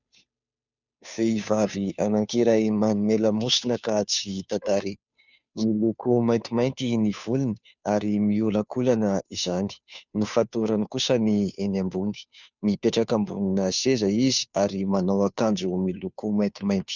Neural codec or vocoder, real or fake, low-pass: codec, 16 kHz, 2 kbps, FunCodec, trained on Chinese and English, 25 frames a second; fake; 7.2 kHz